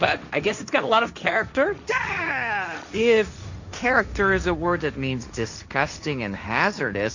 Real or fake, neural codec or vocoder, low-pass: fake; codec, 16 kHz, 1.1 kbps, Voila-Tokenizer; 7.2 kHz